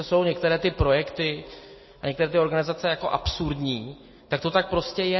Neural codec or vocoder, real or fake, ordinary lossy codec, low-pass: none; real; MP3, 24 kbps; 7.2 kHz